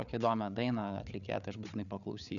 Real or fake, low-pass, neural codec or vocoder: fake; 7.2 kHz; codec, 16 kHz, 8 kbps, FreqCodec, larger model